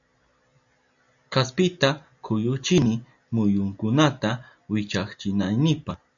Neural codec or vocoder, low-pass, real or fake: none; 7.2 kHz; real